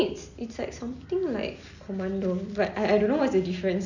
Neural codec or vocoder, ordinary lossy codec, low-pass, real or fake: none; none; 7.2 kHz; real